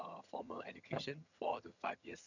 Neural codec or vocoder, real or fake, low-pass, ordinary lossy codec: vocoder, 22.05 kHz, 80 mel bands, HiFi-GAN; fake; 7.2 kHz; none